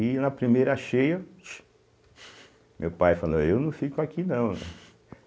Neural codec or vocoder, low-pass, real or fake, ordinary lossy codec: none; none; real; none